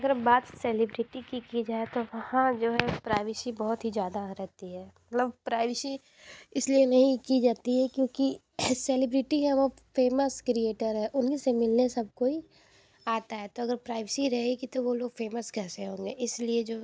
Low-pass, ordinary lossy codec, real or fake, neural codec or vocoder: none; none; real; none